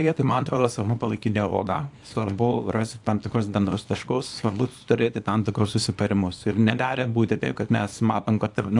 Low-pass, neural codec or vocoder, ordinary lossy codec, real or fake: 10.8 kHz; codec, 24 kHz, 0.9 kbps, WavTokenizer, small release; AAC, 64 kbps; fake